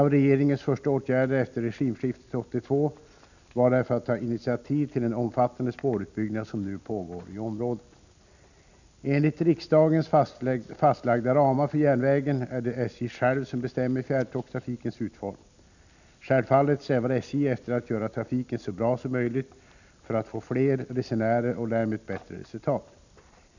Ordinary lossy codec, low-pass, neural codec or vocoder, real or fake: none; 7.2 kHz; none; real